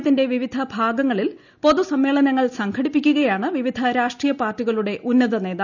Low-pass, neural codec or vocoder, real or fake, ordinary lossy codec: 7.2 kHz; none; real; none